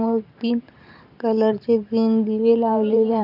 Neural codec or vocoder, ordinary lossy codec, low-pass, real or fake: vocoder, 44.1 kHz, 128 mel bands every 512 samples, BigVGAN v2; none; 5.4 kHz; fake